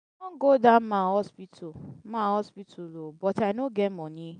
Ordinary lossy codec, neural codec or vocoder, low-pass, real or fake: none; none; 9.9 kHz; real